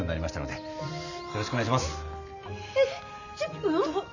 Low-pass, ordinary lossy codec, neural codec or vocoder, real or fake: 7.2 kHz; none; none; real